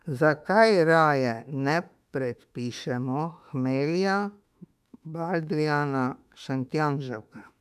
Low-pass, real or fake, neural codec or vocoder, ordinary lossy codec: 14.4 kHz; fake; autoencoder, 48 kHz, 32 numbers a frame, DAC-VAE, trained on Japanese speech; none